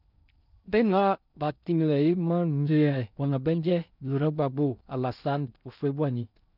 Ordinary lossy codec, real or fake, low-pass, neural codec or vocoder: none; fake; 5.4 kHz; codec, 16 kHz in and 24 kHz out, 0.6 kbps, FocalCodec, streaming, 2048 codes